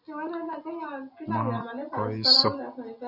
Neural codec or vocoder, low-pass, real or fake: none; 5.4 kHz; real